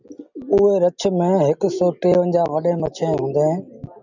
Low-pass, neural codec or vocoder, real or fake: 7.2 kHz; none; real